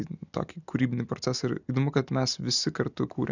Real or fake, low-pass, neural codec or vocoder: real; 7.2 kHz; none